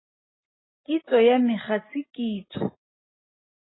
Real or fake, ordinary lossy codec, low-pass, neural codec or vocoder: real; AAC, 16 kbps; 7.2 kHz; none